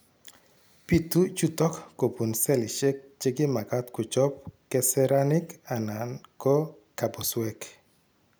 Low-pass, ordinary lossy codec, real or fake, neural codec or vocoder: none; none; real; none